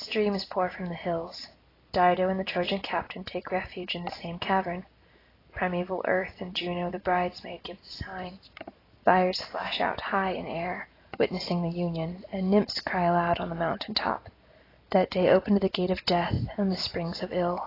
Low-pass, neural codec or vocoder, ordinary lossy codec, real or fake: 5.4 kHz; none; AAC, 24 kbps; real